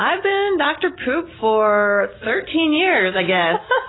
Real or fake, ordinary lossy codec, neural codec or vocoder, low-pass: real; AAC, 16 kbps; none; 7.2 kHz